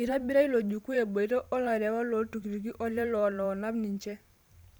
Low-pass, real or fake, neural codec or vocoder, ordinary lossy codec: none; fake; vocoder, 44.1 kHz, 128 mel bands every 512 samples, BigVGAN v2; none